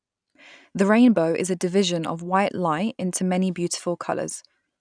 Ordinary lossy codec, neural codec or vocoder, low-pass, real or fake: none; none; 9.9 kHz; real